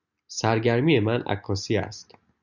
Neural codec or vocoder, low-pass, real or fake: none; 7.2 kHz; real